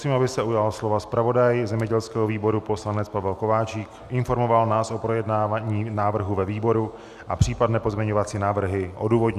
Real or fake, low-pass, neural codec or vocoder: real; 14.4 kHz; none